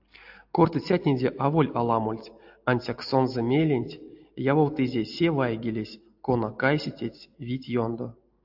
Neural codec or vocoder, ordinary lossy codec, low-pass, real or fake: none; AAC, 48 kbps; 5.4 kHz; real